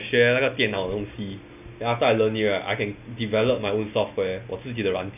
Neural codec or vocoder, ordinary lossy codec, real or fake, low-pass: none; none; real; 3.6 kHz